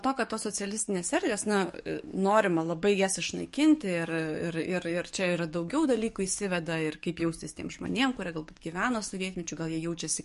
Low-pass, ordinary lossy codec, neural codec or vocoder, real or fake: 14.4 kHz; MP3, 48 kbps; codec, 44.1 kHz, 7.8 kbps, DAC; fake